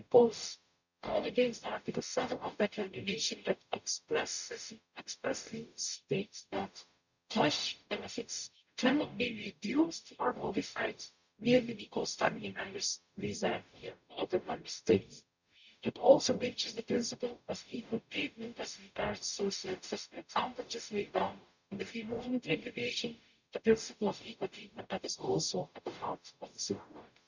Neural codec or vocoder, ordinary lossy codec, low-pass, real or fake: codec, 44.1 kHz, 0.9 kbps, DAC; none; 7.2 kHz; fake